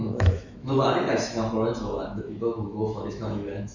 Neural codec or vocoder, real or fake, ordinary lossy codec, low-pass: autoencoder, 48 kHz, 128 numbers a frame, DAC-VAE, trained on Japanese speech; fake; Opus, 64 kbps; 7.2 kHz